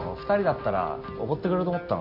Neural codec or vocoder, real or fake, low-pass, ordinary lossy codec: none; real; 5.4 kHz; AAC, 32 kbps